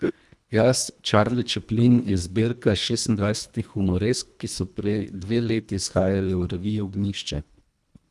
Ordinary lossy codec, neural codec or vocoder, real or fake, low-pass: none; codec, 24 kHz, 1.5 kbps, HILCodec; fake; none